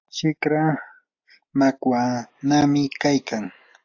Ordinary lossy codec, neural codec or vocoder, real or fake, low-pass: AAC, 48 kbps; none; real; 7.2 kHz